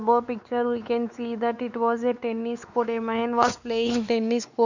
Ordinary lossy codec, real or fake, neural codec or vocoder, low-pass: none; fake; codec, 16 kHz, 4 kbps, X-Codec, WavLM features, trained on Multilingual LibriSpeech; 7.2 kHz